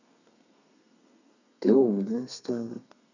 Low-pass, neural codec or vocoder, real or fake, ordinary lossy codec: 7.2 kHz; codec, 32 kHz, 1.9 kbps, SNAC; fake; none